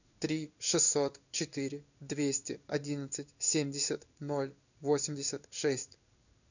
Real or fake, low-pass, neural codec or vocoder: fake; 7.2 kHz; codec, 16 kHz in and 24 kHz out, 1 kbps, XY-Tokenizer